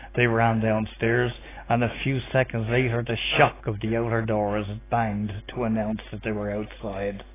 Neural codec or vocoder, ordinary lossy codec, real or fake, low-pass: none; AAC, 16 kbps; real; 3.6 kHz